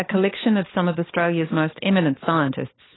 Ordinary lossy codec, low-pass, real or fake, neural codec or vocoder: AAC, 16 kbps; 7.2 kHz; fake; codec, 16 kHz, 4 kbps, FunCodec, trained on LibriTTS, 50 frames a second